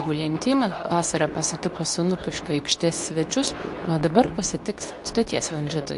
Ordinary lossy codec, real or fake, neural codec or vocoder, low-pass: AAC, 64 kbps; fake; codec, 24 kHz, 0.9 kbps, WavTokenizer, medium speech release version 2; 10.8 kHz